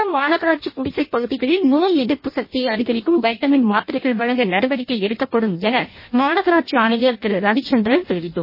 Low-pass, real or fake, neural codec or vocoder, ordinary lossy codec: 5.4 kHz; fake; codec, 16 kHz in and 24 kHz out, 0.6 kbps, FireRedTTS-2 codec; MP3, 24 kbps